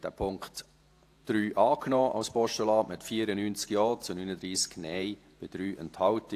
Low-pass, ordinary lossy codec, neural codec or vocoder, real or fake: 14.4 kHz; AAC, 64 kbps; vocoder, 48 kHz, 128 mel bands, Vocos; fake